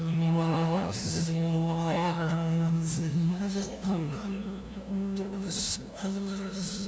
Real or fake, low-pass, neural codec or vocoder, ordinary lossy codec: fake; none; codec, 16 kHz, 0.5 kbps, FunCodec, trained on LibriTTS, 25 frames a second; none